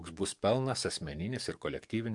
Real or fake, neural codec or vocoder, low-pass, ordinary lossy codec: fake; codec, 44.1 kHz, 7.8 kbps, DAC; 10.8 kHz; MP3, 64 kbps